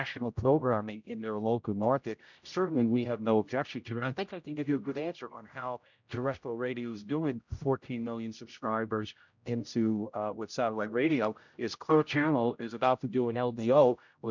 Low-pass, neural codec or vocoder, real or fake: 7.2 kHz; codec, 16 kHz, 0.5 kbps, X-Codec, HuBERT features, trained on general audio; fake